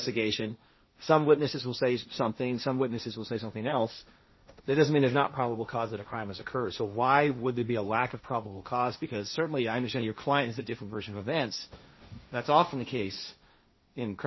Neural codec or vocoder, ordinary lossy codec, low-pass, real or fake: codec, 16 kHz, 1.1 kbps, Voila-Tokenizer; MP3, 24 kbps; 7.2 kHz; fake